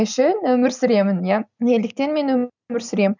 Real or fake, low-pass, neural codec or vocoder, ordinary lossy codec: real; 7.2 kHz; none; none